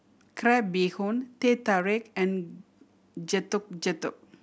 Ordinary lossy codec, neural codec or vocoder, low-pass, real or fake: none; none; none; real